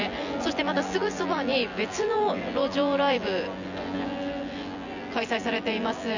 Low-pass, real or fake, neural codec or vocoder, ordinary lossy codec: 7.2 kHz; fake; vocoder, 24 kHz, 100 mel bands, Vocos; none